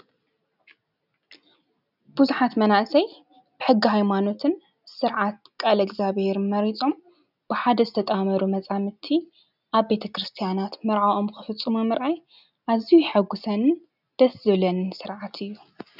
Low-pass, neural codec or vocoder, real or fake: 5.4 kHz; none; real